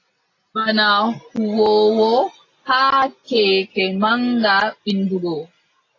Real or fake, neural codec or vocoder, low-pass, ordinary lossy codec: real; none; 7.2 kHz; AAC, 32 kbps